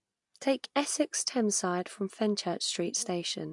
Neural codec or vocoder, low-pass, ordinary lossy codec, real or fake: none; 10.8 kHz; MP3, 64 kbps; real